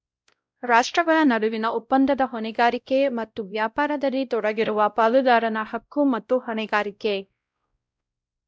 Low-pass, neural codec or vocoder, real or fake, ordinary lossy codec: none; codec, 16 kHz, 0.5 kbps, X-Codec, WavLM features, trained on Multilingual LibriSpeech; fake; none